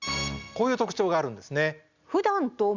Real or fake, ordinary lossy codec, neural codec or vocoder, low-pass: real; Opus, 64 kbps; none; 7.2 kHz